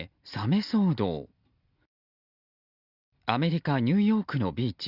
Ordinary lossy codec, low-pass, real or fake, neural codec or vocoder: Opus, 64 kbps; 5.4 kHz; real; none